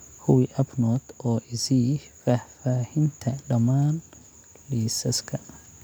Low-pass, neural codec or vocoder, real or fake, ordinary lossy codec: none; none; real; none